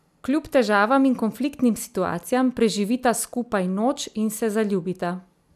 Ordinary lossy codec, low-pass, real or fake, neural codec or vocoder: none; 14.4 kHz; real; none